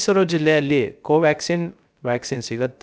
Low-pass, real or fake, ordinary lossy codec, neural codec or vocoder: none; fake; none; codec, 16 kHz, 0.3 kbps, FocalCodec